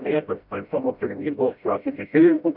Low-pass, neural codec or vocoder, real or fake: 5.4 kHz; codec, 16 kHz, 0.5 kbps, FreqCodec, smaller model; fake